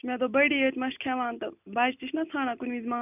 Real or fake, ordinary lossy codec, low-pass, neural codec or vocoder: real; none; 3.6 kHz; none